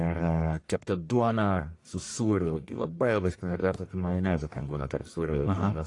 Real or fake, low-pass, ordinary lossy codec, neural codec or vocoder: fake; 10.8 kHz; AAC, 48 kbps; codec, 44.1 kHz, 1.7 kbps, Pupu-Codec